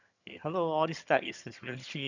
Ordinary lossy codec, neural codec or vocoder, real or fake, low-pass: none; vocoder, 22.05 kHz, 80 mel bands, HiFi-GAN; fake; 7.2 kHz